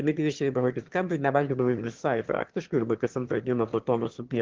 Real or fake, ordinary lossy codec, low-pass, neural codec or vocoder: fake; Opus, 16 kbps; 7.2 kHz; autoencoder, 22.05 kHz, a latent of 192 numbers a frame, VITS, trained on one speaker